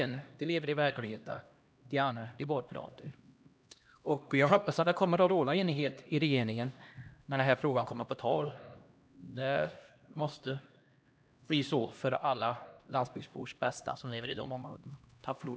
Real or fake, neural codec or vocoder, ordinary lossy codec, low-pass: fake; codec, 16 kHz, 1 kbps, X-Codec, HuBERT features, trained on LibriSpeech; none; none